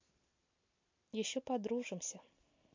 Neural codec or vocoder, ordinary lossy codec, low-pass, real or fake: none; MP3, 48 kbps; 7.2 kHz; real